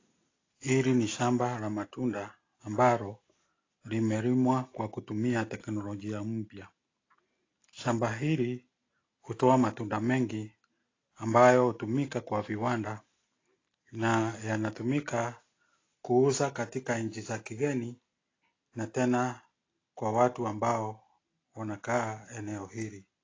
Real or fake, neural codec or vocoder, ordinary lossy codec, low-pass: real; none; AAC, 32 kbps; 7.2 kHz